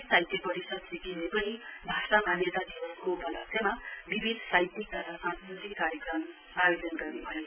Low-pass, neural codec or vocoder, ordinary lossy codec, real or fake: 3.6 kHz; none; none; real